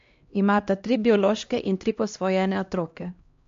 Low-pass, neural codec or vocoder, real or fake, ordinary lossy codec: 7.2 kHz; codec, 16 kHz, 1 kbps, X-Codec, HuBERT features, trained on LibriSpeech; fake; MP3, 48 kbps